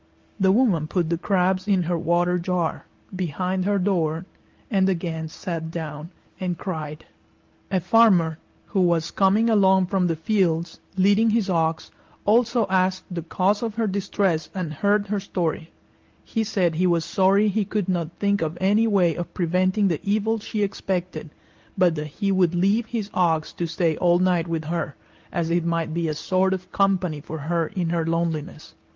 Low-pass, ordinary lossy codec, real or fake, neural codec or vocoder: 7.2 kHz; Opus, 32 kbps; real; none